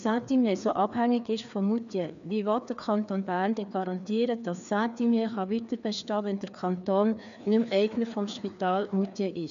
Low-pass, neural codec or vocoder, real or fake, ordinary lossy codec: 7.2 kHz; codec, 16 kHz, 2 kbps, FreqCodec, larger model; fake; none